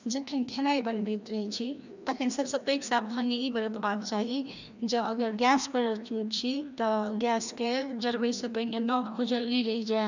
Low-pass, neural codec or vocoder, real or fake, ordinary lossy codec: 7.2 kHz; codec, 16 kHz, 1 kbps, FreqCodec, larger model; fake; none